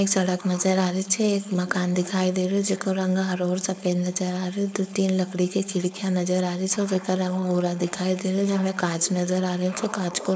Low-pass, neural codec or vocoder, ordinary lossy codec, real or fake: none; codec, 16 kHz, 4.8 kbps, FACodec; none; fake